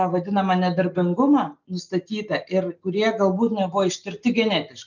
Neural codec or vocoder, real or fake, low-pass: none; real; 7.2 kHz